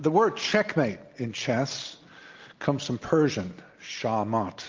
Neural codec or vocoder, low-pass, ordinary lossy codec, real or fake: none; 7.2 kHz; Opus, 32 kbps; real